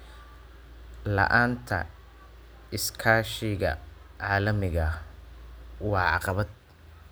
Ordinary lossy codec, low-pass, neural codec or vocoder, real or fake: none; none; none; real